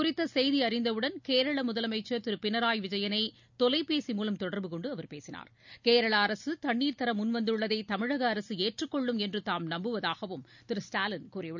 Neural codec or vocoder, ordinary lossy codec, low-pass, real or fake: none; none; 7.2 kHz; real